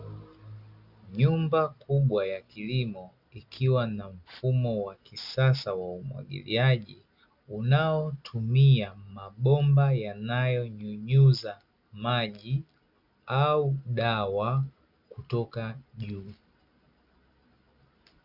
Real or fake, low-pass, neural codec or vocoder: real; 5.4 kHz; none